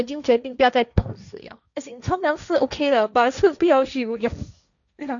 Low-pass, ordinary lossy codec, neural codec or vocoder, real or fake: 7.2 kHz; none; codec, 16 kHz, 1.1 kbps, Voila-Tokenizer; fake